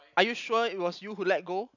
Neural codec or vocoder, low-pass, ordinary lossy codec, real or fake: none; 7.2 kHz; none; real